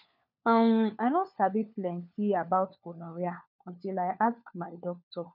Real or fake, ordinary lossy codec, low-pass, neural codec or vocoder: fake; none; 5.4 kHz; codec, 16 kHz, 4 kbps, FunCodec, trained on LibriTTS, 50 frames a second